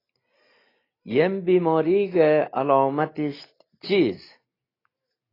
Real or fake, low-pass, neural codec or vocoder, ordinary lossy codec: real; 5.4 kHz; none; AAC, 24 kbps